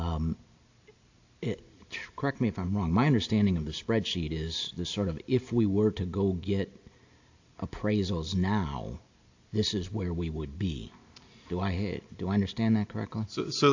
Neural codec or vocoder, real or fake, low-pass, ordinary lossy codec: none; real; 7.2 kHz; AAC, 48 kbps